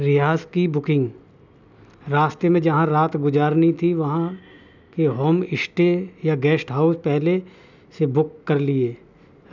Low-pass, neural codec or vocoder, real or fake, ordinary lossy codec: 7.2 kHz; none; real; none